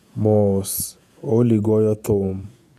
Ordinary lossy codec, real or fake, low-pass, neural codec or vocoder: none; fake; 14.4 kHz; vocoder, 48 kHz, 128 mel bands, Vocos